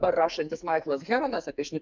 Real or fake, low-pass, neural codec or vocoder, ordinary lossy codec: fake; 7.2 kHz; codec, 44.1 kHz, 2.6 kbps, SNAC; MP3, 64 kbps